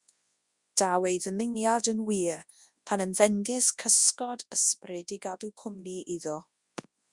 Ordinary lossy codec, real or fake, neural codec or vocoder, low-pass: Opus, 64 kbps; fake; codec, 24 kHz, 0.9 kbps, WavTokenizer, large speech release; 10.8 kHz